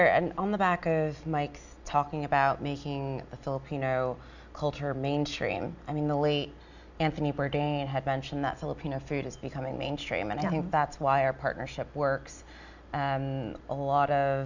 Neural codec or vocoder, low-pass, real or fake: none; 7.2 kHz; real